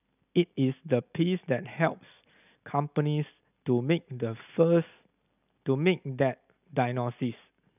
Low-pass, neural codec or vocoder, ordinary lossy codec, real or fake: 3.6 kHz; none; none; real